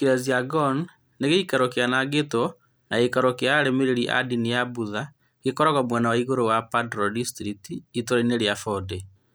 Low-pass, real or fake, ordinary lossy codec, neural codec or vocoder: none; real; none; none